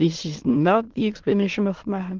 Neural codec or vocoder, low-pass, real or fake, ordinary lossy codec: autoencoder, 22.05 kHz, a latent of 192 numbers a frame, VITS, trained on many speakers; 7.2 kHz; fake; Opus, 16 kbps